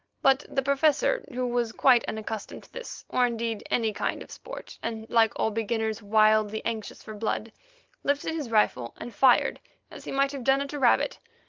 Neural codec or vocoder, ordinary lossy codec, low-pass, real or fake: none; Opus, 32 kbps; 7.2 kHz; real